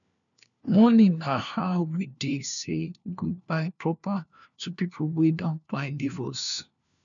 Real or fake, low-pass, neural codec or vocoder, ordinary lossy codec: fake; 7.2 kHz; codec, 16 kHz, 1 kbps, FunCodec, trained on LibriTTS, 50 frames a second; MP3, 96 kbps